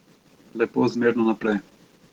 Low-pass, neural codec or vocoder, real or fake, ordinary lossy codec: 19.8 kHz; none; real; Opus, 16 kbps